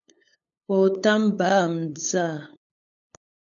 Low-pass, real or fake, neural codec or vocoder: 7.2 kHz; fake; codec, 16 kHz, 8 kbps, FunCodec, trained on LibriTTS, 25 frames a second